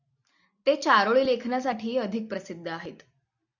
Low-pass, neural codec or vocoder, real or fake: 7.2 kHz; none; real